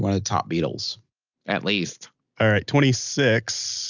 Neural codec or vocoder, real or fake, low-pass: none; real; 7.2 kHz